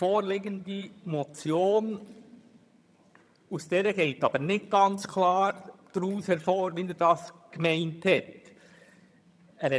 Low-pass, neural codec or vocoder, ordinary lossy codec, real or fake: none; vocoder, 22.05 kHz, 80 mel bands, HiFi-GAN; none; fake